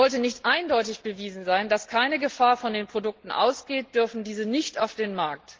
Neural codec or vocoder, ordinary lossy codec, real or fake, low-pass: none; Opus, 16 kbps; real; 7.2 kHz